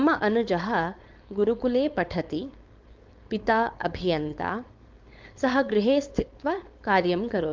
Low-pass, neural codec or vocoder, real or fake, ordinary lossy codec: 7.2 kHz; codec, 16 kHz, 4.8 kbps, FACodec; fake; Opus, 32 kbps